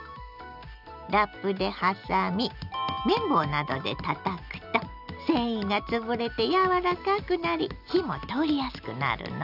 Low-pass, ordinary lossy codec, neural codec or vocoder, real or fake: 5.4 kHz; none; none; real